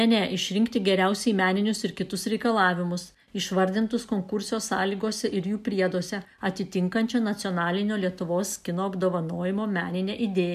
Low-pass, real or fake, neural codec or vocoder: 14.4 kHz; real; none